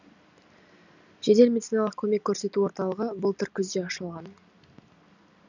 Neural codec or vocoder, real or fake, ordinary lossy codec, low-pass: none; real; none; 7.2 kHz